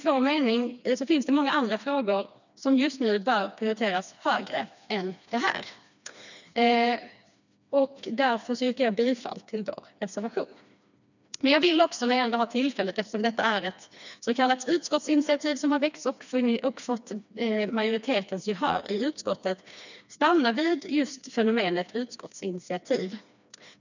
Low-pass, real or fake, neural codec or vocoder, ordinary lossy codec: 7.2 kHz; fake; codec, 16 kHz, 2 kbps, FreqCodec, smaller model; none